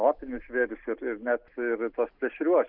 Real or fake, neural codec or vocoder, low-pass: real; none; 5.4 kHz